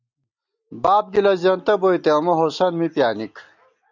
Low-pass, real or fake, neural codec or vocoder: 7.2 kHz; real; none